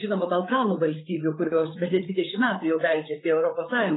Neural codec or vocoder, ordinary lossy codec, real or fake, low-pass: codec, 16 kHz, 8 kbps, FreqCodec, larger model; AAC, 16 kbps; fake; 7.2 kHz